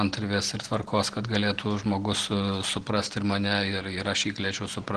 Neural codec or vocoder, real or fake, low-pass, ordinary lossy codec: none; real; 9.9 kHz; Opus, 16 kbps